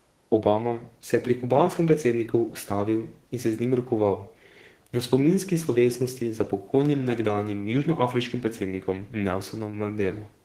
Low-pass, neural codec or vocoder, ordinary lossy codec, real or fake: 14.4 kHz; codec, 32 kHz, 1.9 kbps, SNAC; Opus, 16 kbps; fake